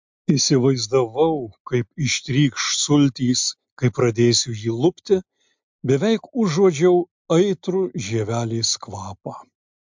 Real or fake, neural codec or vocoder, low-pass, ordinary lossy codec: real; none; 7.2 kHz; MP3, 64 kbps